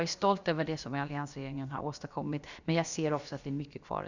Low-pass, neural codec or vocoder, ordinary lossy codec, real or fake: 7.2 kHz; codec, 16 kHz, about 1 kbps, DyCAST, with the encoder's durations; Opus, 64 kbps; fake